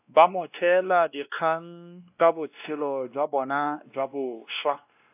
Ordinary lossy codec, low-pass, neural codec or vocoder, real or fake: AAC, 32 kbps; 3.6 kHz; codec, 16 kHz, 1 kbps, X-Codec, WavLM features, trained on Multilingual LibriSpeech; fake